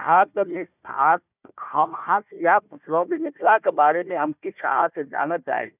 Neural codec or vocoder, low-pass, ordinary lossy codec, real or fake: codec, 16 kHz, 1 kbps, FunCodec, trained on Chinese and English, 50 frames a second; 3.6 kHz; none; fake